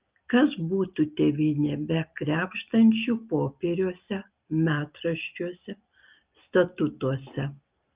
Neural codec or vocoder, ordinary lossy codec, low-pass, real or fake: none; Opus, 32 kbps; 3.6 kHz; real